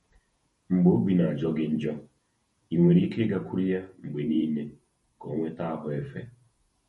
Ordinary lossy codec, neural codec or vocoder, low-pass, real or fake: MP3, 48 kbps; vocoder, 48 kHz, 128 mel bands, Vocos; 19.8 kHz; fake